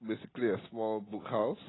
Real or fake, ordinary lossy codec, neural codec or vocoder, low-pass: real; AAC, 16 kbps; none; 7.2 kHz